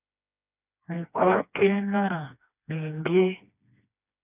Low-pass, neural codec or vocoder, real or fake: 3.6 kHz; codec, 16 kHz, 2 kbps, FreqCodec, smaller model; fake